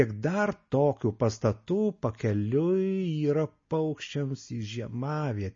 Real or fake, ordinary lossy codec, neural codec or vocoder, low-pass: real; MP3, 32 kbps; none; 7.2 kHz